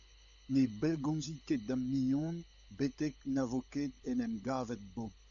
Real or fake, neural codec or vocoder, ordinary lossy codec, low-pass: fake; codec, 16 kHz, 16 kbps, FunCodec, trained on LibriTTS, 50 frames a second; MP3, 96 kbps; 7.2 kHz